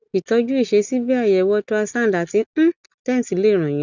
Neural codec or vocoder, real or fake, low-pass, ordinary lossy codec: none; real; 7.2 kHz; none